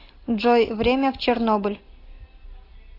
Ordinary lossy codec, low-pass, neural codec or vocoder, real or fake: AAC, 24 kbps; 5.4 kHz; none; real